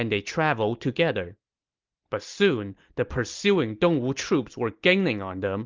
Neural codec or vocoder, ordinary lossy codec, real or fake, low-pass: none; Opus, 32 kbps; real; 7.2 kHz